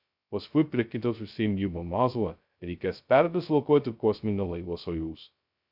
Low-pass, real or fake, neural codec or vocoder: 5.4 kHz; fake; codec, 16 kHz, 0.2 kbps, FocalCodec